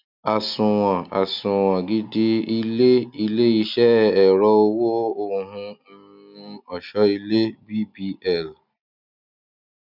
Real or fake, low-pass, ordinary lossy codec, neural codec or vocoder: real; 5.4 kHz; none; none